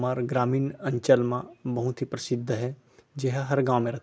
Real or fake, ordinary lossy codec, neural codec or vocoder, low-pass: real; none; none; none